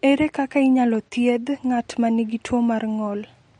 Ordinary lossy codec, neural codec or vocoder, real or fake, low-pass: MP3, 48 kbps; none; real; 9.9 kHz